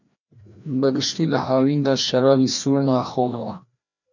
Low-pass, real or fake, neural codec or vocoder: 7.2 kHz; fake; codec, 16 kHz, 1 kbps, FreqCodec, larger model